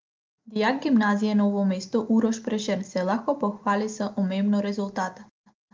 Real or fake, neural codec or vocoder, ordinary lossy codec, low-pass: real; none; Opus, 32 kbps; 7.2 kHz